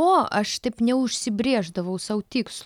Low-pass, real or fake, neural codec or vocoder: 19.8 kHz; real; none